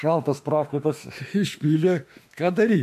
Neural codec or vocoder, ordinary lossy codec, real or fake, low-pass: autoencoder, 48 kHz, 32 numbers a frame, DAC-VAE, trained on Japanese speech; AAC, 96 kbps; fake; 14.4 kHz